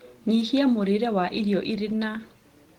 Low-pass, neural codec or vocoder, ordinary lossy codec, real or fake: 19.8 kHz; none; Opus, 16 kbps; real